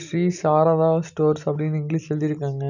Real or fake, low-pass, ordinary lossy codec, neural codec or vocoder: real; 7.2 kHz; none; none